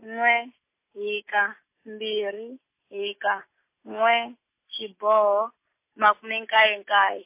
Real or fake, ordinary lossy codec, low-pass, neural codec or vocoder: real; MP3, 24 kbps; 3.6 kHz; none